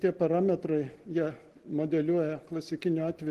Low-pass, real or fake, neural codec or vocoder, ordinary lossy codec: 14.4 kHz; real; none; Opus, 16 kbps